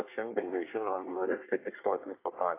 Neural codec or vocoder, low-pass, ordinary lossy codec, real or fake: codec, 24 kHz, 1 kbps, SNAC; 3.6 kHz; AAC, 24 kbps; fake